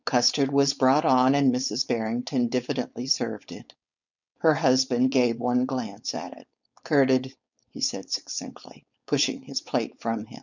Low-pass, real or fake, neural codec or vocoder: 7.2 kHz; fake; codec, 16 kHz, 4.8 kbps, FACodec